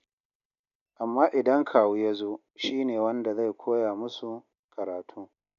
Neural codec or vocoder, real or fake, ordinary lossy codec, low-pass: none; real; none; 7.2 kHz